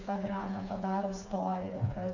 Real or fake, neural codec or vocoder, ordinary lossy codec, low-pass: fake; codec, 16 kHz, 4 kbps, FreqCodec, smaller model; AAC, 48 kbps; 7.2 kHz